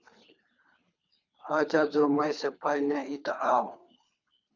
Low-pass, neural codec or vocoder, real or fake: 7.2 kHz; codec, 24 kHz, 3 kbps, HILCodec; fake